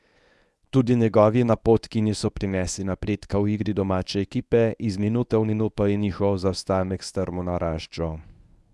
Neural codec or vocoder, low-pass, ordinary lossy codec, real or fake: codec, 24 kHz, 0.9 kbps, WavTokenizer, medium speech release version 1; none; none; fake